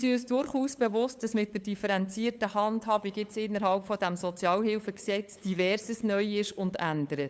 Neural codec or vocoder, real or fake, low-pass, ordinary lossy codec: codec, 16 kHz, 8 kbps, FunCodec, trained on LibriTTS, 25 frames a second; fake; none; none